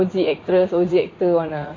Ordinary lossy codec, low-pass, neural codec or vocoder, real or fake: AAC, 32 kbps; 7.2 kHz; vocoder, 44.1 kHz, 80 mel bands, Vocos; fake